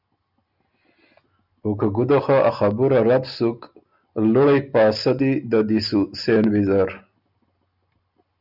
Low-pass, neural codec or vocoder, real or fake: 5.4 kHz; none; real